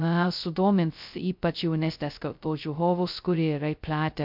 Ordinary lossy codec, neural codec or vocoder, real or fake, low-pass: MP3, 48 kbps; codec, 16 kHz, 0.2 kbps, FocalCodec; fake; 5.4 kHz